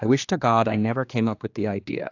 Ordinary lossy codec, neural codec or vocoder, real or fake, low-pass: AAC, 48 kbps; codec, 16 kHz, 2 kbps, X-Codec, HuBERT features, trained on general audio; fake; 7.2 kHz